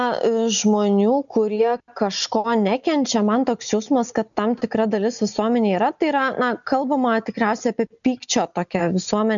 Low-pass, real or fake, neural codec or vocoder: 7.2 kHz; real; none